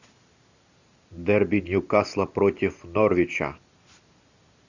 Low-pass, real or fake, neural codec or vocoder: 7.2 kHz; real; none